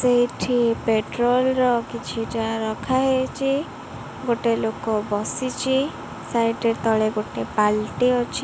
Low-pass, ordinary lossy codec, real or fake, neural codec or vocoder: none; none; real; none